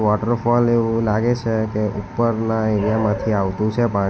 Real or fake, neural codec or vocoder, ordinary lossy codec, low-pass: real; none; none; none